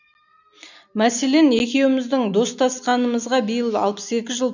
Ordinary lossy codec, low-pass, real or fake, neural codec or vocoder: none; 7.2 kHz; real; none